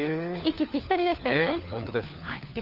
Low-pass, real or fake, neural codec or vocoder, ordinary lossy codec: 5.4 kHz; fake; codec, 16 kHz, 4 kbps, FunCodec, trained on LibriTTS, 50 frames a second; Opus, 24 kbps